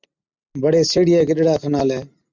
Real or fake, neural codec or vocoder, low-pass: real; none; 7.2 kHz